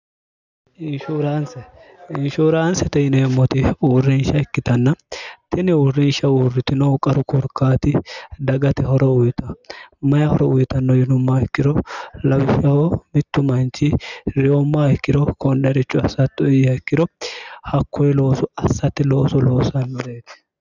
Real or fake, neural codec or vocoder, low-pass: real; none; 7.2 kHz